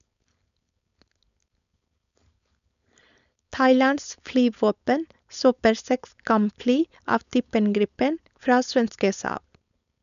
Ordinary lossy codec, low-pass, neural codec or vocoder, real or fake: none; 7.2 kHz; codec, 16 kHz, 4.8 kbps, FACodec; fake